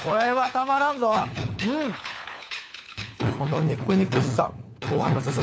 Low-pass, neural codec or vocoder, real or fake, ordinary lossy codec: none; codec, 16 kHz, 4 kbps, FunCodec, trained on LibriTTS, 50 frames a second; fake; none